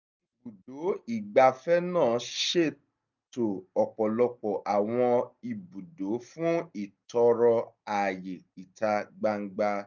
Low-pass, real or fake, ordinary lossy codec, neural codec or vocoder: 7.2 kHz; real; none; none